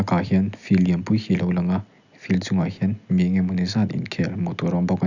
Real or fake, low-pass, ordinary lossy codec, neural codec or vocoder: real; 7.2 kHz; none; none